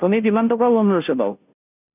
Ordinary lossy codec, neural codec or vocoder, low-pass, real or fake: none; codec, 16 kHz, 0.5 kbps, FunCodec, trained on Chinese and English, 25 frames a second; 3.6 kHz; fake